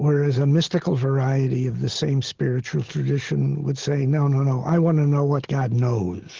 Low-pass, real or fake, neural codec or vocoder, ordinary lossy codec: 7.2 kHz; real; none; Opus, 16 kbps